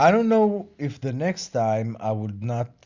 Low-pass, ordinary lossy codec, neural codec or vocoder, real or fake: 7.2 kHz; Opus, 64 kbps; none; real